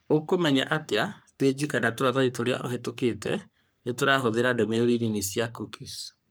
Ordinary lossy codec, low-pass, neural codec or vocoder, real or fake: none; none; codec, 44.1 kHz, 3.4 kbps, Pupu-Codec; fake